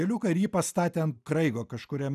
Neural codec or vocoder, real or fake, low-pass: none; real; 14.4 kHz